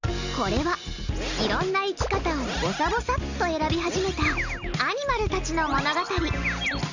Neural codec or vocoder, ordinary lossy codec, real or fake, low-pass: none; none; real; 7.2 kHz